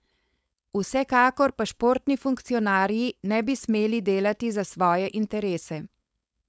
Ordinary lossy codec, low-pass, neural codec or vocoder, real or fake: none; none; codec, 16 kHz, 4.8 kbps, FACodec; fake